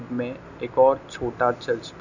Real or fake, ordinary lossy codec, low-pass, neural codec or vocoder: real; none; 7.2 kHz; none